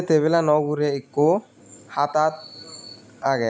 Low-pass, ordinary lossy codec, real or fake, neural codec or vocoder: none; none; real; none